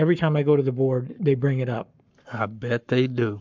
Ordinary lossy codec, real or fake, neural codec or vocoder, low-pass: MP3, 64 kbps; fake; codec, 44.1 kHz, 7.8 kbps, Pupu-Codec; 7.2 kHz